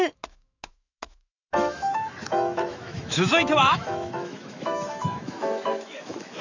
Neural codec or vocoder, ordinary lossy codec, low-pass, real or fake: autoencoder, 48 kHz, 128 numbers a frame, DAC-VAE, trained on Japanese speech; none; 7.2 kHz; fake